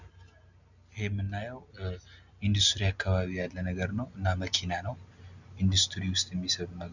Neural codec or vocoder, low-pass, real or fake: none; 7.2 kHz; real